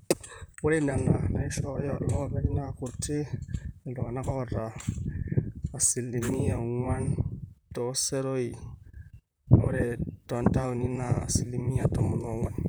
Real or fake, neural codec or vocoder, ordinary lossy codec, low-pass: fake; vocoder, 44.1 kHz, 128 mel bands, Pupu-Vocoder; none; none